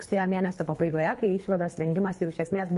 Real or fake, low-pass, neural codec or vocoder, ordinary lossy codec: fake; 10.8 kHz; codec, 24 kHz, 3 kbps, HILCodec; MP3, 48 kbps